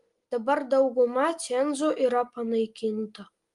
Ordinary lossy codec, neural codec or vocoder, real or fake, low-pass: Opus, 32 kbps; none; real; 10.8 kHz